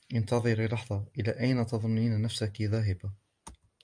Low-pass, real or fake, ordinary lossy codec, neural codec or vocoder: 9.9 kHz; real; MP3, 96 kbps; none